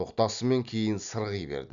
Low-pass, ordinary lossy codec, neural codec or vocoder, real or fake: 7.2 kHz; none; none; real